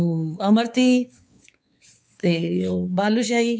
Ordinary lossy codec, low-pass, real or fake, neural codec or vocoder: none; none; fake; codec, 16 kHz, 4 kbps, X-Codec, WavLM features, trained on Multilingual LibriSpeech